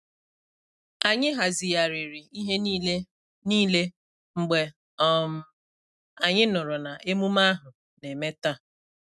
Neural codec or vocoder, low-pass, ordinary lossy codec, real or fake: none; none; none; real